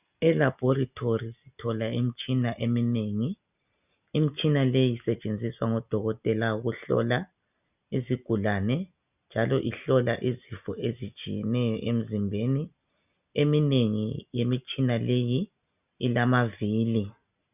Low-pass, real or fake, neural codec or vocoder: 3.6 kHz; real; none